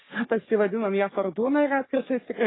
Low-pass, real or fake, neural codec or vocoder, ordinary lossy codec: 7.2 kHz; fake; codec, 44.1 kHz, 3.4 kbps, Pupu-Codec; AAC, 16 kbps